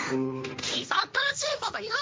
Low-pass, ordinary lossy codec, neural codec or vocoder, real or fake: none; none; codec, 16 kHz, 1.1 kbps, Voila-Tokenizer; fake